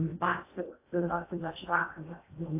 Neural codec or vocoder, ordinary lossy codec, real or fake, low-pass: codec, 16 kHz in and 24 kHz out, 0.6 kbps, FocalCodec, streaming, 2048 codes; AAC, 24 kbps; fake; 3.6 kHz